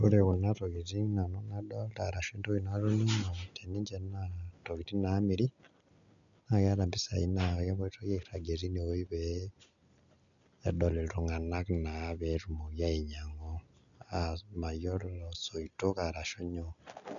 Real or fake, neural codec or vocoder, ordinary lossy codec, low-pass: real; none; none; 7.2 kHz